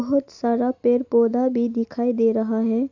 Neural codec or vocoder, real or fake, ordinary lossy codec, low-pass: none; real; AAC, 48 kbps; 7.2 kHz